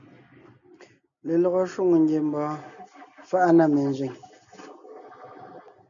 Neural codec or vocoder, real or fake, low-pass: none; real; 7.2 kHz